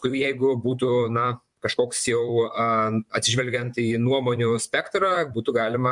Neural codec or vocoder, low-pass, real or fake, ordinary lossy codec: vocoder, 44.1 kHz, 128 mel bands, Pupu-Vocoder; 10.8 kHz; fake; MP3, 64 kbps